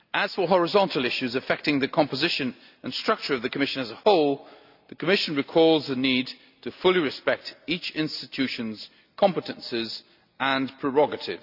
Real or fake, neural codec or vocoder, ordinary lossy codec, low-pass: real; none; none; 5.4 kHz